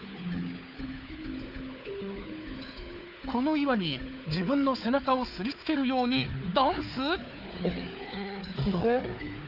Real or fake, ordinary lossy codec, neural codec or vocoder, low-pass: fake; none; codec, 16 kHz, 4 kbps, FunCodec, trained on Chinese and English, 50 frames a second; 5.4 kHz